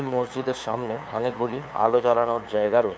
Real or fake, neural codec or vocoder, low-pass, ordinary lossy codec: fake; codec, 16 kHz, 2 kbps, FunCodec, trained on LibriTTS, 25 frames a second; none; none